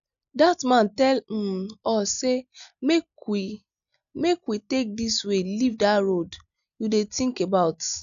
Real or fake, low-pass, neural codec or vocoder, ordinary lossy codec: real; 7.2 kHz; none; none